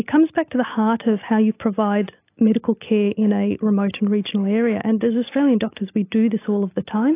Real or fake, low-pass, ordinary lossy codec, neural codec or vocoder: real; 3.6 kHz; AAC, 24 kbps; none